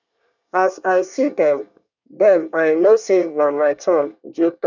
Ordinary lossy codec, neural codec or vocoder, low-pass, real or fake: none; codec, 24 kHz, 1 kbps, SNAC; 7.2 kHz; fake